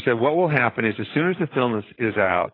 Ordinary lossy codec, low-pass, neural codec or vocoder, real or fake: AAC, 24 kbps; 5.4 kHz; codec, 16 kHz, 8 kbps, FreqCodec, larger model; fake